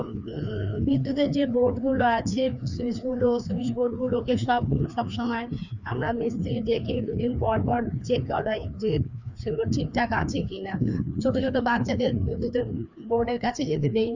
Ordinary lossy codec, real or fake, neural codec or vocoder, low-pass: none; fake; codec, 16 kHz, 2 kbps, FreqCodec, larger model; 7.2 kHz